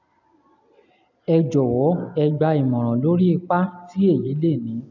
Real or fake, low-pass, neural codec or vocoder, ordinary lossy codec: real; 7.2 kHz; none; none